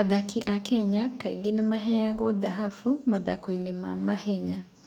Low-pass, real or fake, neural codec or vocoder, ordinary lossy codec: 19.8 kHz; fake; codec, 44.1 kHz, 2.6 kbps, DAC; none